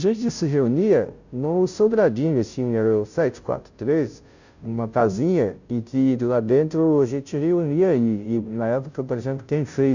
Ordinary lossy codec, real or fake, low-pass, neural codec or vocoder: none; fake; 7.2 kHz; codec, 16 kHz, 0.5 kbps, FunCodec, trained on Chinese and English, 25 frames a second